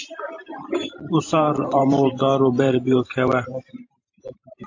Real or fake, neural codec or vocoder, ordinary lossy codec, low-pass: fake; vocoder, 44.1 kHz, 128 mel bands every 512 samples, BigVGAN v2; AAC, 48 kbps; 7.2 kHz